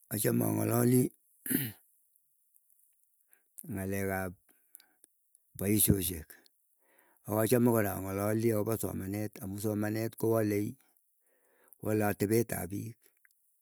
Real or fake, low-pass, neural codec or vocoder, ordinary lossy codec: real; none; none; none